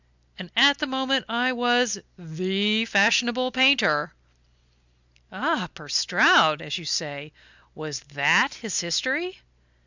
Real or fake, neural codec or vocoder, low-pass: real; none; 7.2 kHz